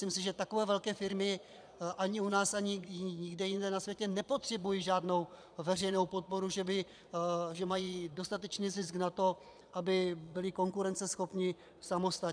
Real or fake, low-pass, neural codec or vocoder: fake; 9.9 kHz; vocoder, 22.05 kHz, 80 mel bands, Vocos